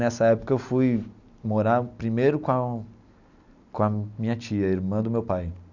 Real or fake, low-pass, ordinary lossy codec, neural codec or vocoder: real; 7.2 kHz; none; none